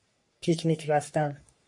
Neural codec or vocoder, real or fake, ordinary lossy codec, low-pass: codec, 44.1 kHz, 3.4 kbps, Pupu-Codec; fake; MP3, 48 kbps; 10.8 kHz